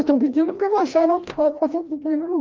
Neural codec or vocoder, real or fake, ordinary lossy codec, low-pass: codec, 16 kHz, 1 kbps, FreqCodec, larger model; fake; Opus, 24 kbps; 7.2 kHz